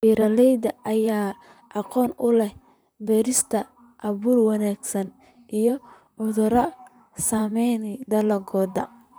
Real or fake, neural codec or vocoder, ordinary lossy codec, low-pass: fake; vocoder, 44.1 kHz, 128 mel bands, Pupu-Vocoder; none; none